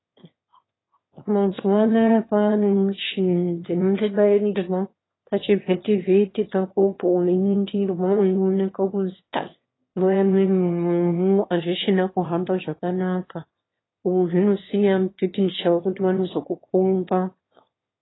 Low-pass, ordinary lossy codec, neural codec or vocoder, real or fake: 7.2 kHz; AAC, 16 kbps; autoencoder, 22.05 kHz, a latent of 192 numbers a frame, VITS, trained on one speaker; fake